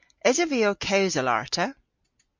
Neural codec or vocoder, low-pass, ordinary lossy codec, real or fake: none; 7.2 kHz; MP3, 48 kbps; real